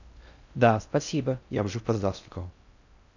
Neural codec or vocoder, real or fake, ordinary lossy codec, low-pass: codec, 16 kHz in and 24 kHz out, 0.6 kbps, FocalCodec, streaming, 2048 codes; fake; none; 7.2 kHz